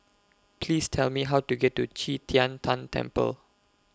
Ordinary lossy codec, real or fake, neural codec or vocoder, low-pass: none; real; none; none